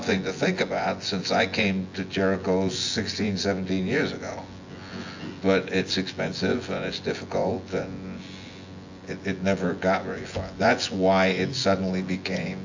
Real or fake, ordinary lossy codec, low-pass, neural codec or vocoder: fake; AAC, 48 kbps; 7.2 kHz; vocoder, 24 kHz, 100 mel bands, Vocos